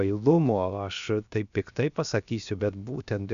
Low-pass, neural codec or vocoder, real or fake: 7.2 kHz; codec, 16 kHz, 0.7 kbps, FocalCodec; fake